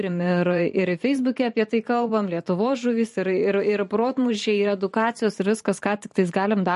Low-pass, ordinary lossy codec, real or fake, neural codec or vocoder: 14.4 kHz; MP3, 48 kbps; fake; vocoder, 44.1 kHz, 128 mel bands every 512 samples, BigVGAN v2